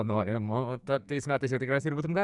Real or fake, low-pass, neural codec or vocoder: fake; 10.8 kHz; codec, 44.1 kHz, 2.6 kbps, SNAC